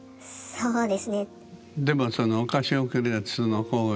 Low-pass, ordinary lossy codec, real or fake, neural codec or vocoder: none; none; real; none